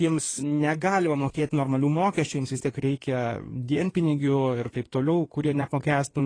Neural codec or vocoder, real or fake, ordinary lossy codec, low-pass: codec, 16 kHz in and 24 kHz out, 2.2 kbps, FireRedTTS-2 codec; fake; AAC, 32 kbps; 9.9 kHz